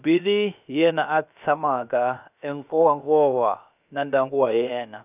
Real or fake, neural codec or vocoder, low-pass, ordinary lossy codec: fake; codec, 16 kHz, about 1 kbps, DyCAST, with the encoder's durations; 3.6 kHz; none